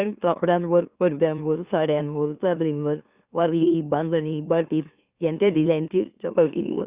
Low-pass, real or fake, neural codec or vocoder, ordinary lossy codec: 3.6 kHz; fake; autoencoder, 44.1 kHz, a latent of 192 numbers a frame, MeloTTS; Opus, 64 kbps